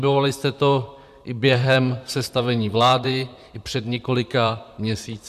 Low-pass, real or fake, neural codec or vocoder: 14.4 kHz; fake; vocoder, 44.1 kHz, 128 mel bands, Pupu-Vocoder